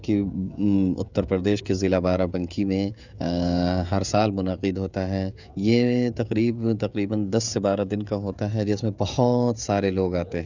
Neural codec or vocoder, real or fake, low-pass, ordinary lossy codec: codec, 16 kHz, 16 kbps, FreqCodec, smaller model; fake; 7.2 kHz; none